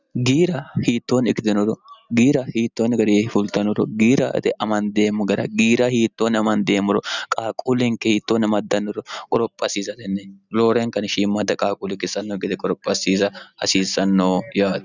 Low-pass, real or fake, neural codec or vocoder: 7.2 kHz; real; none